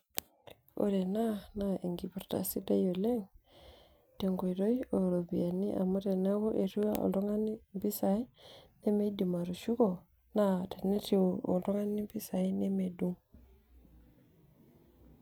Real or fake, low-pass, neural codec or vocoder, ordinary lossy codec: fake; none; vocoder, 44.1 kHz, 128 mel bands every 256 samples, BigVGAN v2; none